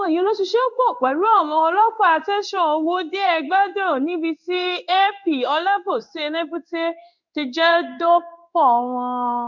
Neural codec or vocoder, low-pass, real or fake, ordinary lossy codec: codec, 16 kHz in and 24 kHz out, 1 kbps, XY-Tokenizer; 7.2 kHz; fake; none